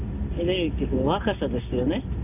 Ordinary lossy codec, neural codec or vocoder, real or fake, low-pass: none; none; real; 3.6 kHz